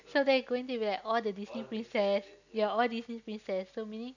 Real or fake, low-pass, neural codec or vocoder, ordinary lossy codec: real; 7.2 kHz; none; none